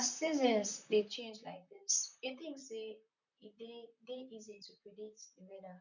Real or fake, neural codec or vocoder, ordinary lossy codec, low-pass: fake; vocoder, 44.1 kHz, 128 mel bands, Pupu-Vocoder; none; 7.2 kHz